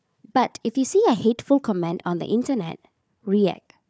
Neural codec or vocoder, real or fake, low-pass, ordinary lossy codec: codec, 16 kHz, 4 kbps, FunCodec, trained on Chinese and English, 50 frames a second; fake; none; none